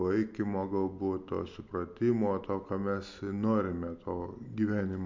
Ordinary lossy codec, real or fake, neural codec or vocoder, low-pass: MP3, 48 kbps; real; none; 7.2 kHz